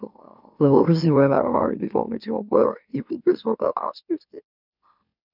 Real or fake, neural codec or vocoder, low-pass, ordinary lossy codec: fake; autoencoder, 44.1 kHz, a latent of 192 numbers a frame, MeloTTS; 5.4 kHz; none